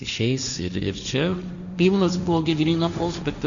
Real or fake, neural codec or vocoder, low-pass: fake; codec, 16 kHz, 1.1 kbps, Voila-Tokenizer; 7.2 kHz